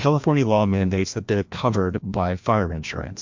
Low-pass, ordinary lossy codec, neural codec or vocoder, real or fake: 7.2 kHz; MP3, 64 kbps; codec, 16 kHz, 1 kbps, FreqCodec, larger model; fake